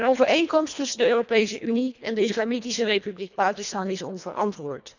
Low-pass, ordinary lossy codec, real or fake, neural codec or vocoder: 7.2 kHz; none; fake; codec, 24 kHz, 1.5 kbps, HILCodec